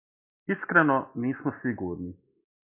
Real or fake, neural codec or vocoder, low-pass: real; none; 3.6 kHz